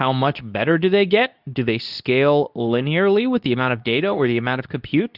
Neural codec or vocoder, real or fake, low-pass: codec, 24 kHz, 0.9 kbps, WavTokenizer, medium speech release version 2; fake; 5.4 kHz